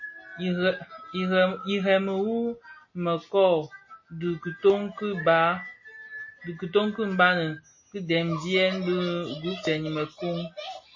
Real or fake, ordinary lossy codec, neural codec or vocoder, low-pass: real; MP3, 32 kbps; none; 7.2 kHz